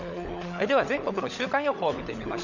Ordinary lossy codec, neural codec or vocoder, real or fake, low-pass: none; codec, 16 kHz, 16 kbps, FunCodec, trained on LibriTTS, 50 frames a second; fake; 7.2 kHz